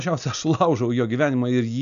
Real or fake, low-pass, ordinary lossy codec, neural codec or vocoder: real; 7.2 kHz; MP3, 96 kbps; none